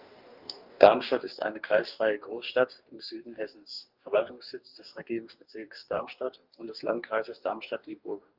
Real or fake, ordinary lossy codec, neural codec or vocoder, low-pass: fake; Opus, 32 kbps; codec, 44.1 kHz, 2.6 kbps, DAC; 5.4 kHz